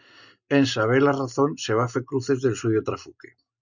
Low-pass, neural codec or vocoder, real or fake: 7.2 kHz; none; real